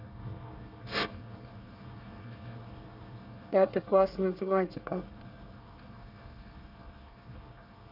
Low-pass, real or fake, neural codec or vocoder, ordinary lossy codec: 5.4 kHz; fake; codec, 24 kHz, 1 kbps, SNAC; none